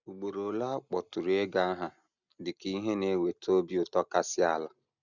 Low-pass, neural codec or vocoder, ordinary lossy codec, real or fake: 7.2 kHz; none; none; real